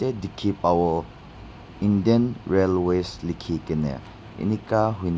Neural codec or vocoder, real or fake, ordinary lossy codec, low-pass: none; real; none; none